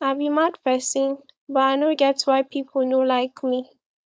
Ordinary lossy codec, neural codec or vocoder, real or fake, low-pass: none; codec, 16 kHz, 4.8 kbps, FACodec; fake; none